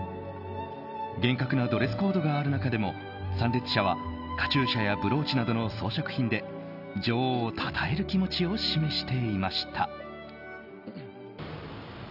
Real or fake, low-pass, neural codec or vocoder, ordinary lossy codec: real; 5.4 kHz; none; none